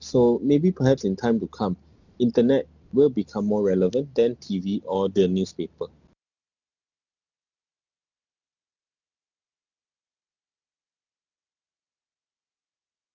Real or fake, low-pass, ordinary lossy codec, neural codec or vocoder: real; 7.2 kHz; none; none